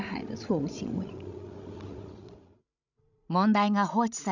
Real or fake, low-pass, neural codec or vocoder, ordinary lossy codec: fake; 7.2 kHz; codec, 16 kHz, 16 kbps, FreqCodec, larger model; none